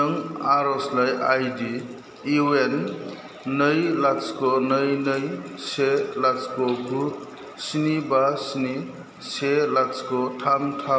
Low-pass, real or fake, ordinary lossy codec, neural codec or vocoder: none; real; none; none